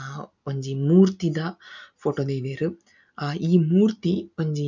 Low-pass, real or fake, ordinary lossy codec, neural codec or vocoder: 7.2 kHz; real; none; none